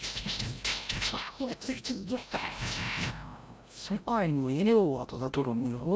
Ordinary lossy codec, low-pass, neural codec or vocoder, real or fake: none; none; codec, 16 kHz, 0.5 kbps, FreqCodec, larger model; fake